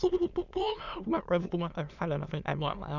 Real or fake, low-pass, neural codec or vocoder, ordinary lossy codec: fake; 7.2 kHz; autoencoder, 22.05 kHz, a latent of 192 numbers a frame, VITS, trained on many speakers; none